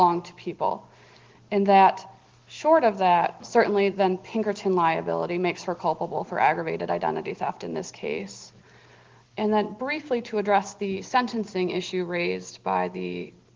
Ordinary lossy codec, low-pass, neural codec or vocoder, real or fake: Opus, 16 kbps; 7.2 kHz; none; real